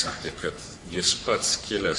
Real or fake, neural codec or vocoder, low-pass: fake; codec, 44.1 kHz, 3.4 kbps, Pupu-Codec; 10.8 kHz